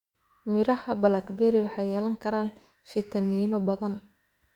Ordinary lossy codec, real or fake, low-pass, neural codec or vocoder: Opus, 64 kbps; fake; 19.8 kHz; autoencoder, 48 kHz, 32 numbers a frame, DAC-VAE, trained on Japanese speech